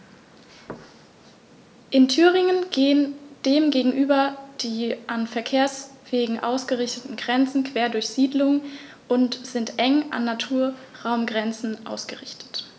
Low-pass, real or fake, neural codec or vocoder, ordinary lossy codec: none; real; none; none